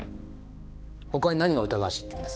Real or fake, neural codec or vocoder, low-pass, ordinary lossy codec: fake; codec, 16 kHz, 2 kbps, X-Codec, HuBERT features, trained on balanced general audio; none; none